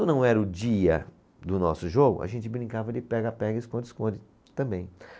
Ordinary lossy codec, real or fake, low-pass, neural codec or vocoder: none; real; none; none